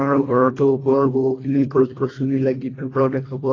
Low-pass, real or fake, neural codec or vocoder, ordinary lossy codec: 7.2 kHz; fake; codec, 24 kHz, 1.5 kbps, HILCodec; AAC, 32 kbps